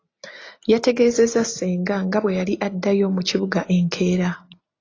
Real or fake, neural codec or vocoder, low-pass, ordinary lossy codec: real; none; 7.2 kHz; AAC, 32 kbps